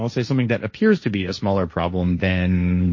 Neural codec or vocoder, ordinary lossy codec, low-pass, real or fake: codec, 16 kHz, 1.1 kbps, Voila-Tokenizer; MP3, 32 kbps; 7.2 kHz; fake